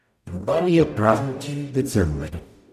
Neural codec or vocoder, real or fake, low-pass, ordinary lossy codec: codec, 44.1 kHz, 0.9 kbps, DAC; fake; 14.4 kHz; none